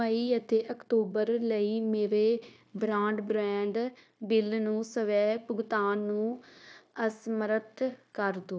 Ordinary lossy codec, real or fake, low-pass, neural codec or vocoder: none; fake; none; codec, 16 kHz, 0.9 kbps, LongCat-Audio-Codec